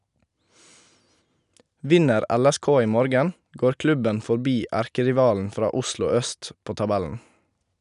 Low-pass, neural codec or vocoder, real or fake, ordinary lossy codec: 10.8 kHz; none; real; none